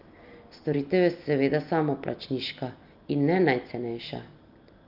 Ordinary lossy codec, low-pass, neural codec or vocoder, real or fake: Opus, 24 kbps; 5.4 kHz; none; real